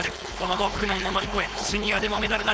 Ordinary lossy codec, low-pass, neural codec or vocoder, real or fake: none; none; codec, 16 kHz, 4.8 kbps, FACodec; fake